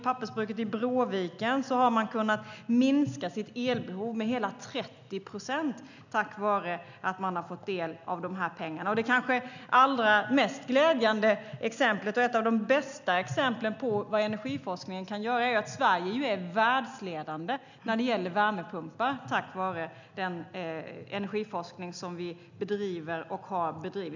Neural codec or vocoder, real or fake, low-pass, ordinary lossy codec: none; real; 7.2 kHz; none